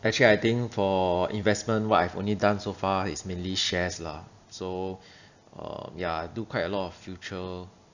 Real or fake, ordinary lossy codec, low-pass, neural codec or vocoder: real; none; 7.2 kHz; none